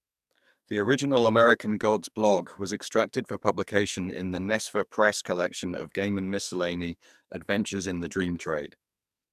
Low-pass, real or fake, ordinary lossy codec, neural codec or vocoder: 14.4 kHz; fake; none; codec, 44.1 kHz, 2.6 kbps, SNAC